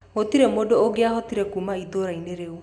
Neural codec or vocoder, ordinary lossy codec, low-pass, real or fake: none; none; none; real